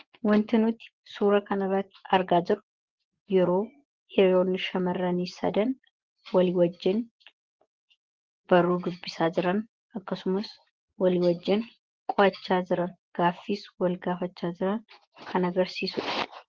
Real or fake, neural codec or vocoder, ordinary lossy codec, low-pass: fake; vocoder, 24 kHz, 100 mel bands, Vocos; Opus, 16 kbps; 7.2 kHz